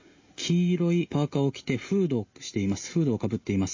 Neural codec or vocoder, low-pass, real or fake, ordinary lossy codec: none; 7.2 kHz; real; MP3, 32 kbps